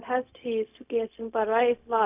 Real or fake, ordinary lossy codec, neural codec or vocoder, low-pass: fake; none; codec, 16 kHz, 0.4 kbps, LongCat-Audio-Codec; 3.6 kHz